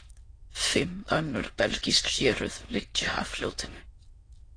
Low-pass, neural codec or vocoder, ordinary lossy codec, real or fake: 9.9 kHz; autoencoder, 22.05 kHz, a latent of 192 numbers a frame, VITS, trained on many speakers; AAC, 32 kbps; fake